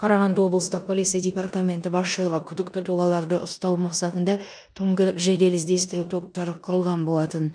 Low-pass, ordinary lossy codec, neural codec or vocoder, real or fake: 9.9 kHz; none; codec, 16 kHz in and 24 kHz out, 0.9 kbps, LongCat-Audio-Codec, four codebook decoder; fake